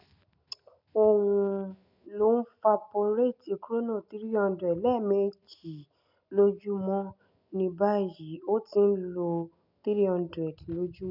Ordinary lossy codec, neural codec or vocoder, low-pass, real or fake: none; none; 5.4 kHz; real